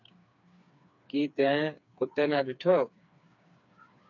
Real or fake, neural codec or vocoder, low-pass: fake; codec, 16 kHz, 4 kbps, FreqCodec, smaller model; 7.2 kHz